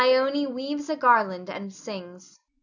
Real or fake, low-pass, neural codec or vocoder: real; 7.2 kHz; none